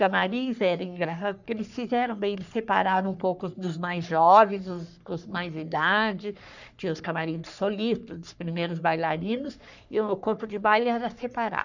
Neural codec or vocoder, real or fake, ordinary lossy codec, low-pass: codec, 44.1 kHz, 3.4 kbps, Pupu-Codec; fake; none; 7.2 kHz